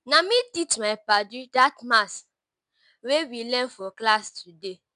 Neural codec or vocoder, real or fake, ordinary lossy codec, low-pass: none; real; none; 10.8 kHz